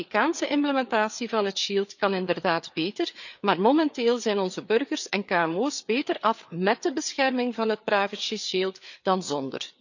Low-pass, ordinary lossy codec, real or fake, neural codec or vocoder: 7.2 kHz; none; fake; codec, 16 kHz, 4 kbps, FreqCodec, larger model